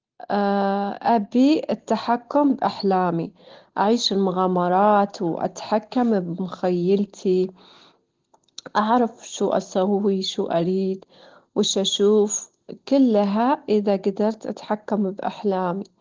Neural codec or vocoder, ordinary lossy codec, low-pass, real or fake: none; Opus, 16 kbps; 7.2 kHz; real